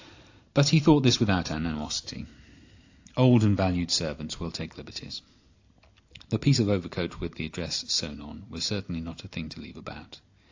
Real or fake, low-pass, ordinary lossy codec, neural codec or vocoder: real; 7.2 kHz; AAC, 48 kbps; none